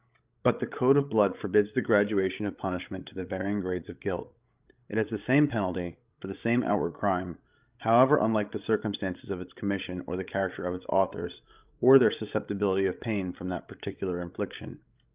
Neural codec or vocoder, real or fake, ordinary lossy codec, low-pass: codec, 16 kHz, 16 kbps, FreqCodec, larger model; fake; Opus, 32 kbps; 3.6 kHz